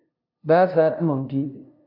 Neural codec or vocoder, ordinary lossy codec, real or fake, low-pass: codec, 16 kHz, 0.5 kbps, FunCodec, trained on LibriTTS, 25 frames a second; MP3, 48 kbps; fake; 5.4 kHz